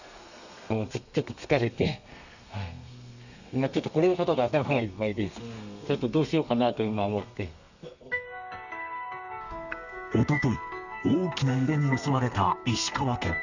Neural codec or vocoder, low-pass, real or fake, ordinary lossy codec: codec, 32 kHz, 1.9 kbps, SNAC; 7.2 kHz; fake; none